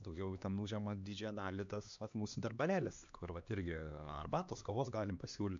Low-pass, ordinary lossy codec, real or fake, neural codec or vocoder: 7.2 kHz; MP3, 64 kbps; fake; codec, 16 kHz, 2 kbps, X-Codec, HuBERT features, trained on LibriSpeech